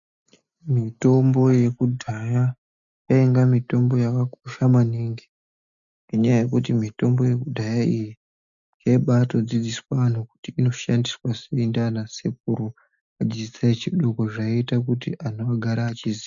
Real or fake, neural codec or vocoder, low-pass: real; none; 7.2 kHz